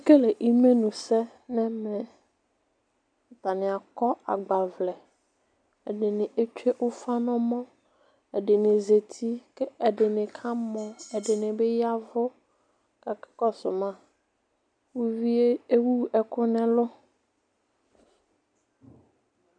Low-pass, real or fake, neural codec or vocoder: 9.9 kHz; real; none